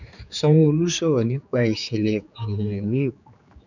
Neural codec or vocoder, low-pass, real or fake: codec, 16 kHz, 4 kbps, X-Codec, HuBERT features, trained on general audio; 7.2 kHz; fake